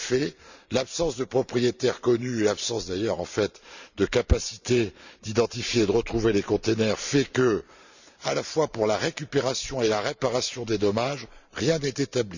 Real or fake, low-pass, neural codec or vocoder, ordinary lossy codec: fake; 7.2 kHz; vocoder, 44.1 kHz, 128 mel bands every 512 samples, BigVGAN v2; none